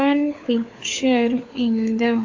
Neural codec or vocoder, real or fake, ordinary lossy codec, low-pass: codec, 16 kHz, 4 kbps, FunCodec, trained on LibriTTS, 50 frames a second; fake; AAC, 48 kbps; 7.2 kHz